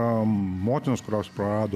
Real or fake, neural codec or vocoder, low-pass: real; none; 14.4 kHz